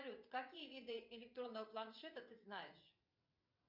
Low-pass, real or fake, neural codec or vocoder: 5.4 kHz; fake; vocoder, 22.05 kHz, 80 mel bands, WaveNeXt